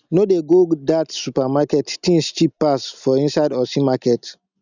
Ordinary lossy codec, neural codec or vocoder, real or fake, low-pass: none; none; real; 7.2 kHz